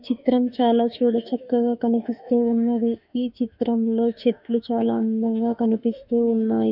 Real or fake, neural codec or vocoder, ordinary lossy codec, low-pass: fake; autoencoder, 48 kHz, 32 numbers a frame, DAC-VAE, trained on Japanese speech; none; 5.4 kHz